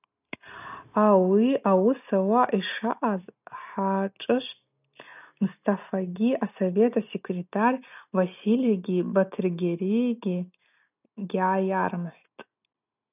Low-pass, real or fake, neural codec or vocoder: 3.6 kHz; real; none